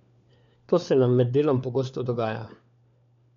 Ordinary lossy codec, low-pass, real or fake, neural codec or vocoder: MP3, 64 kbps; 7.2 kHz; fake; codec, 16 kHz, 4 kbps, FunCodec, trained on LibriTTS, 50 frames a second